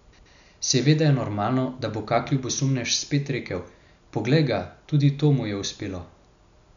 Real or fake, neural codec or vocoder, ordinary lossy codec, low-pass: real; none; none; 7.2 kHz